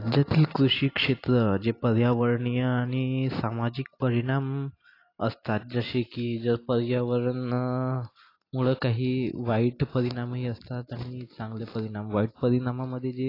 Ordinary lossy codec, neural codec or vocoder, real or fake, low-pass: AAC, 32 kbps; none; real; 5.4 kHz